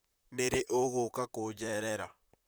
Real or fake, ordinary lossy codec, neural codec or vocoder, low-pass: fake; none; vocoder, 44.1 kHz, 128 mel bands, Pupu-Vocoder; none